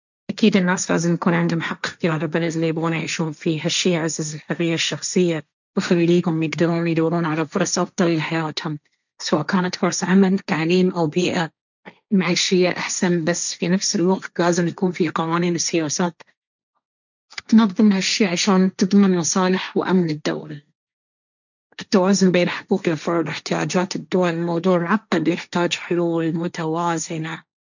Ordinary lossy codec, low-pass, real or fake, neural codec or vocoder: none; 7.2 kHz; fake; codec, 16 kHz, 1.1 kbps, Voila-Tokenizer